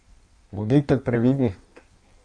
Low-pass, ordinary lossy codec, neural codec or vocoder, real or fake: 9.9 kHz; none; codec, 16 kHz in and 24 kHz out, 1.1 kbps, FireRedTTS-2 codec; fake